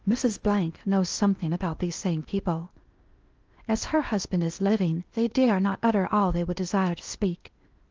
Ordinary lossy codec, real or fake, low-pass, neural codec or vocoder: Opus, 24 kbps; fake; 7.2 kHz; codec, 16 kHz in and 24 kHz out, 0.8 kbps, FocalCodec, streaming, 65536 codes